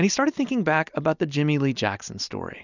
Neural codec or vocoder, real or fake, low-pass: none; real; 7.2 kHz